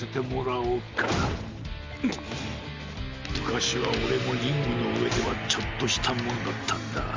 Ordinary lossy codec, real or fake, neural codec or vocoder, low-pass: Opus, 32 kbps; real; none; 7.2 kHz